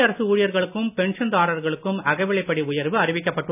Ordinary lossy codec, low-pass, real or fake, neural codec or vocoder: none; 3.6 kHz; real; none